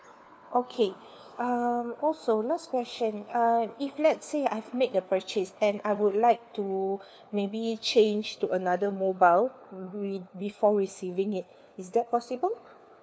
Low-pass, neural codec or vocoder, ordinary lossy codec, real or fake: none; codec, 16 kHz, 4 kbps, FunCodec, trained on LibriTTS, 50 frames a second; none; fake